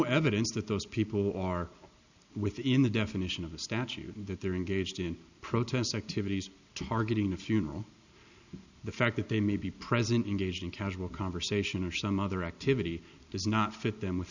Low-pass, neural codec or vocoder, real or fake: 7.2 kHz; none; real